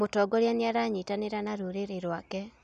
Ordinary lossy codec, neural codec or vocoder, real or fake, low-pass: none; none; real; 9.9 kHz